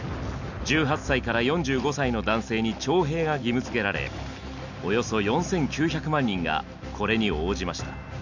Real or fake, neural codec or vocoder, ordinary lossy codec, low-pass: real; none; none; 7.2 kHz